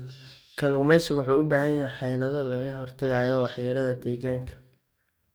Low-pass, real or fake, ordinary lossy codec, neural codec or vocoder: none; fake; none; codec, 44.1 kHz, 2.6 kbps, DAC